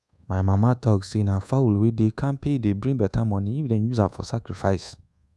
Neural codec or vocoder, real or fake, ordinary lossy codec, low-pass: codec, 24 kHz, 1.2 kbps, DualCodec; fake; none; none